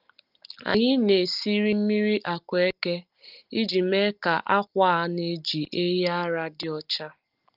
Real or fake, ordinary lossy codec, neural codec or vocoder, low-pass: real; Opus, 32 kbps; none; 5.4 kHz